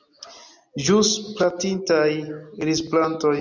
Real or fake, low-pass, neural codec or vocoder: real; 7.2 kHz; none